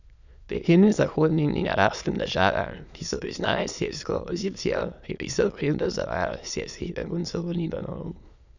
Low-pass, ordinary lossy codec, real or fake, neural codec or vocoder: 7.2 kHz; none; fake; autoencoder, 22.05 kHz, a latent of 192 numbers a frame, VITS, trained on many speakers